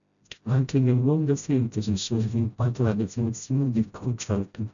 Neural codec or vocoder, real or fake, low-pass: codec, 16 kHz, 0.5 kbps, FreqCodec, smaller model; fake; 7.2 kHz